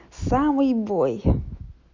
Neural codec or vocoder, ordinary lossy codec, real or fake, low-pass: none; MP3, 64 kbps; real; 7.2 kHz